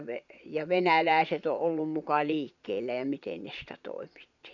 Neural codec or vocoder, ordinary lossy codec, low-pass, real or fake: vocoder, 22.05 kHz, 80 mel bands, Vocos; none; 7.2 kHz; fake